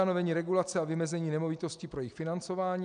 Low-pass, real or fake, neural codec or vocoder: 9.9 kHz; real; none